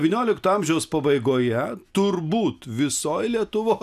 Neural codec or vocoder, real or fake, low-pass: none; real; 14.4 kHz